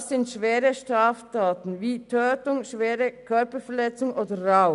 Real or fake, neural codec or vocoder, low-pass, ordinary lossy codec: real; none; 10.8 kHz; none